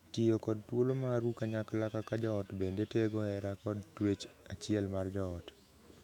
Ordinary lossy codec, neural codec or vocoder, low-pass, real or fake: none; autoencoder, 48 kHz, 128 numbers a frame, DAC-VAE, trained on Japanese speech; 19.8 kHz; fake